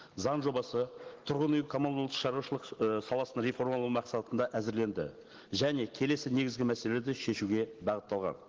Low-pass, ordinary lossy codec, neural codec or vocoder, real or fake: 7.2 kHz; Opus, 16 kbps; none; real